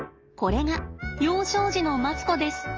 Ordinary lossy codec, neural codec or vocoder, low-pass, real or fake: Opus, 24 kbps; none; 7.2 kHz; real